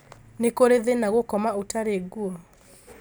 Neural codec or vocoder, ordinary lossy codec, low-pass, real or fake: none; none; none; real